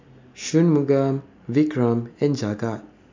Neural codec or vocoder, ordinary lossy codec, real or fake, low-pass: none; MP3, 64 kbps; real; 7.2 kHz